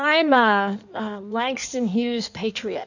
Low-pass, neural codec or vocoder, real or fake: 7.2 kHz; codec, 16 kHz in and 24 kHz out, 1.1 kbps, FireRedTTS-2 codec; fake